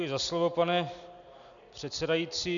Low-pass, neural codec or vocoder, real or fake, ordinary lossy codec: 7.2 kHz; none; real; MP3, 96 kbps